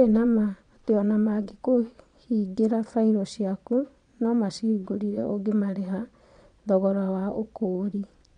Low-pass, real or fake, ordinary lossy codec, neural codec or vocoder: 9.9 kHz; fake; MP3, 64 kbps; vocoder, 22.05 kHz, 80 mel bands, Vocos